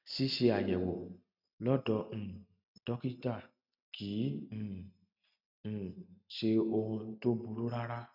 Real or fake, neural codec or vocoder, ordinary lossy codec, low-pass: real; none; none; 5.4 kHz